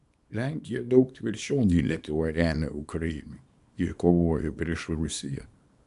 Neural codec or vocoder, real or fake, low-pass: codec, 24 kHz, 0.9 kbps, WavTokenizer, small release; fake; 10.8 kHz